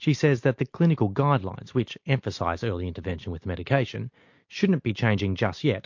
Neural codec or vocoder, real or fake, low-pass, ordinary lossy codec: none; real; 7.2 kHz; MP3, 48 kbps